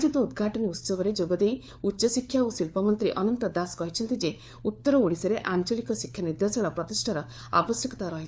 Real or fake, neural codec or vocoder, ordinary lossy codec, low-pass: fake; codec, 16 kHz, 4 kbps, FunCodec, trained on LibriTTS, 50 frames a second; none; none